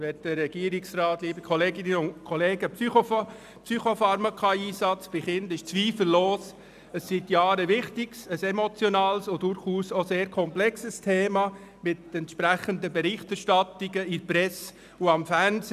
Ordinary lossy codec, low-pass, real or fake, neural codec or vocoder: none; 14.4 kHz; real; none